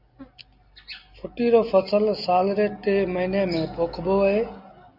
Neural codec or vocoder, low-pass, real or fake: none; 5.4 kHz; real